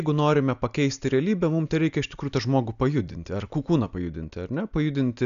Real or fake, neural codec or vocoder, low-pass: real; none; 7.2 kHz